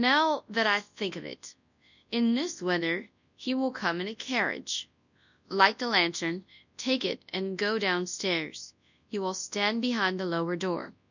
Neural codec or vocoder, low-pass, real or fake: codec, 24 kHz, 0.9 kbps, WavTokenizer, large speech release; 7.2 kHz; fake